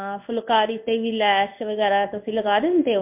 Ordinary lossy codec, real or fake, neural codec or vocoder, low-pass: MP3, 32 kbps; fake; codec, 16 kHz in and 24 kHz out, 1 kbps, XY-Tokenizer; 3.6 kHz